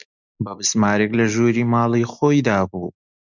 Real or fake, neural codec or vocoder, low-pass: real; none; 7.2 kHz